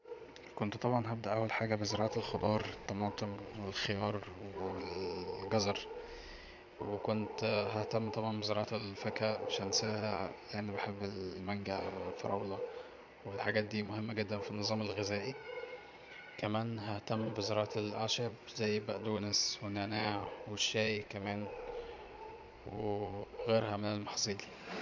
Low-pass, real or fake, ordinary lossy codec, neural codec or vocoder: 7.2 kHz; fake; MP3, 64 kbps; vocoder, 22.05 kHz, 80 mel bands, Vocos